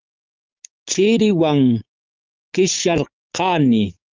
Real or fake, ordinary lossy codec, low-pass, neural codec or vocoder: fake; Opus, 32 kbps; 7.2 kHz; codec, 16 kHz, 6 kbps, DAC